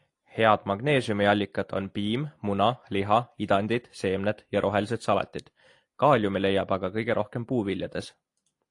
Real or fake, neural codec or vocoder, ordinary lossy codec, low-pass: real; none; AAC, 48 kbps; 10.8 kHz